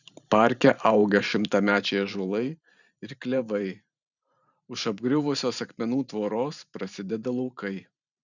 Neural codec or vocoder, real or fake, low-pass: vocoder, 44.1 kHz, 128 mel bands every 512 samples, BigVGAN v2; fake; 7.2 kHz